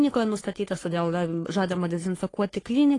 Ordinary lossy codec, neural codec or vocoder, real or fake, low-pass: AAC, 32 kbps; codec, 44.1 kHz, 3.4 kbps, Pupu-Codec; fake; 10.8 kHz